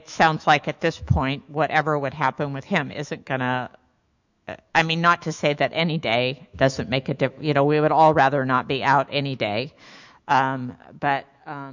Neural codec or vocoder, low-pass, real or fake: autoencoder, 48 kHz, 128 numbers a frame, DAC-VAE, trained on Japanese speech; 7.2 kHz; fake